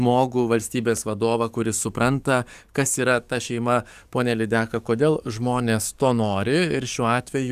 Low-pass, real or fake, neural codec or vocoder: 14.4 kHz; fake; codec, 44.1 kHz, 7.8 kbps, DAC